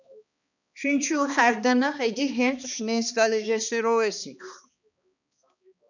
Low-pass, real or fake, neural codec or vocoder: 7.2 kHz; fake; codec, 16 kHz, 2 kbps, X-Codec, HuBERT features, trained on balanced general audio